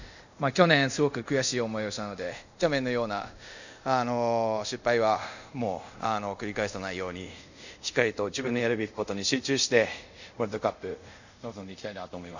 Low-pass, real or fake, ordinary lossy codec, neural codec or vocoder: 7.2 kHz; fake; none; codec, 24 kHz, 0.5 kbps, DualCodec